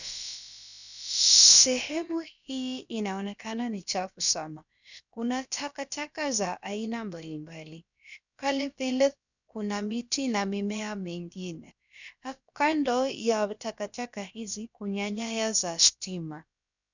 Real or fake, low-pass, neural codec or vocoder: fake; 7.2 kHz; codec, 16 kHz, about 1 kbps, DyCAST, with the encoder's durations